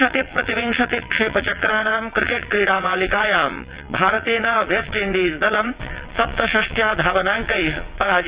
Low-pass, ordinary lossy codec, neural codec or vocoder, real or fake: 3.6 kHz; Opus, 64 kbps; vocoder, 22.05 kHz, 80 mel bands, WaveNeXt; fake